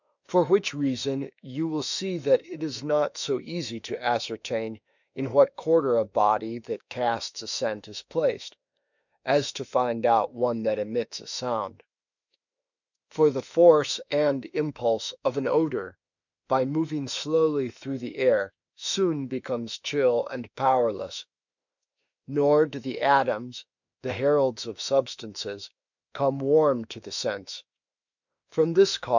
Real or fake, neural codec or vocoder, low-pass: fake; autoencoder, 48 kHz, 32 numbers a frame, DAC-VAE, trained on Japanese speech; 7.2 kHz